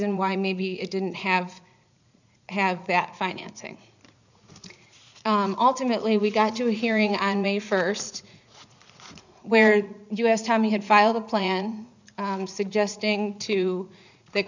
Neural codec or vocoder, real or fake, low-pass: vocoder, 44.1 kHz, 80 mel bands, Vocos; fake; 7.2 kHz